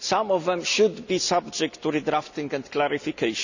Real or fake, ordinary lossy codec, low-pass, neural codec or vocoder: real; none; 7.2 kHz; none